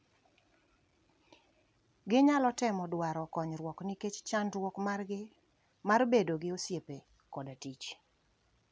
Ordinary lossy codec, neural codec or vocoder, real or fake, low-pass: none; none; real; none